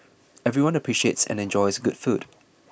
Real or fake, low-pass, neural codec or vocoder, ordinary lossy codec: real; none; none; none